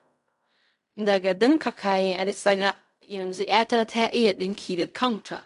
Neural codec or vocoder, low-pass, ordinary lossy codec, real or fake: codec, 16 kHz in and 24 kHz out, 0.4 kbps, LongCat-Audio-Codec, fine tuned four codebook decoder; 10.8 kHz; AAC, 96 kbps; fake